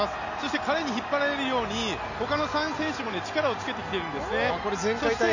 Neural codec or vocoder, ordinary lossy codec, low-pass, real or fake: none; none; 7.2 kHz; real